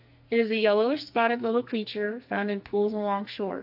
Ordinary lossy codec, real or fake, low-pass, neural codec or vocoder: AAC, 48 kbps; fake; 5.4 kHz; codec, 44.1 kHz, 2.6 kbps, SNAC